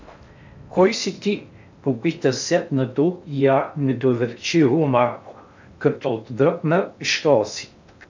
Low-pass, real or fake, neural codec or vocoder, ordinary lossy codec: 7.2 kHz; fake; codec, 16 kHz in and 24 kHz out, 0.6 kbps, FocalCodec, streaming, 4096 codes; MP3, 64 kbps